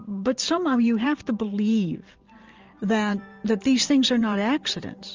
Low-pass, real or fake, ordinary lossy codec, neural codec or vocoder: 7.2 kHz; fake; Opus, 32 kbps; vocoder, 44.1 kHz, 128 mel bands every 512 samples, BigVGAN v2